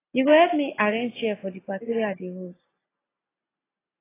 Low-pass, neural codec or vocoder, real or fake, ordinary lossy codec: 3.6 kHz; none; real; AAC, 16 kbps